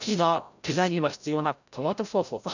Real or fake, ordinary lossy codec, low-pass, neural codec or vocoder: fake; none; 7.2 kHz; codec, 16 kHz, 0.5 kbps, FreqCodec, larger model